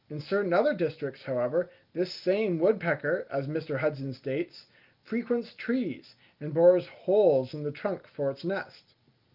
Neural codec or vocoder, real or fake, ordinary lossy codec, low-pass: none; real; Opus, 32 kbps; 5.4 kHz